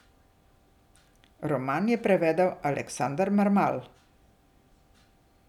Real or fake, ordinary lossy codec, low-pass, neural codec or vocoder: real; none; 19.8 kHz; none